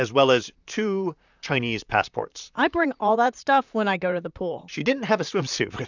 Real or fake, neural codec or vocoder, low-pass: fake; vocoder, 44.1 kHz, 128 mel bands, Pupu-Vocoder; 7.2 kHz